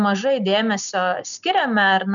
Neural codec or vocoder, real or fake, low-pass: none; real; 7.2 kHz